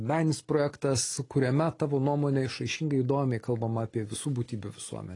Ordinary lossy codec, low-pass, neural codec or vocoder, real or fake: AAC, 32 kbps; 10.8 kHz; none; real